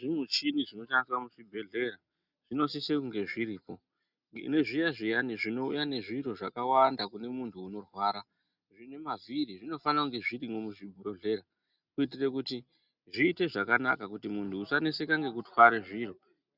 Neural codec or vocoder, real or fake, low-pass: none; real; 5.4 kHz